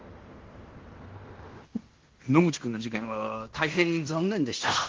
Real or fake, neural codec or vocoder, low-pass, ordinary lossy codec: fake; codec, 16 kHz in and 24 kHz out, 0.9 kbps, LongCat-Audio-Codec, fine tuned four codebook decoder; 7.2 kHz; Opus, 16 kbps